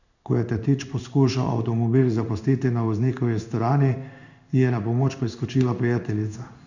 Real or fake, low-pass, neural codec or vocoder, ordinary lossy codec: fake; 7.2 kHz; codec, 16 kHz in and 24 kHz out, 1 kbps, XY-Tokenizer; none